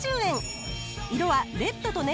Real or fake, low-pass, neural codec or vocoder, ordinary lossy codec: real; none; none; none